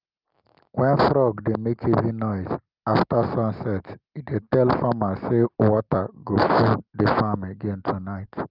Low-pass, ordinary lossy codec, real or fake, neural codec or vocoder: 5.4 kHz; Opus, 24 kbps; real; none